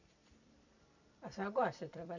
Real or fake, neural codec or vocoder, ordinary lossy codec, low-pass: fake; vocoder, 44.1 kHz, 128 mel bands every 256 samples, BigVGAN v2; none; 7.2 kHz